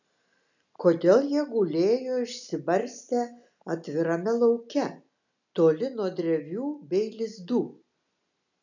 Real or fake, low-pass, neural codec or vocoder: real; 7.2 kHz; none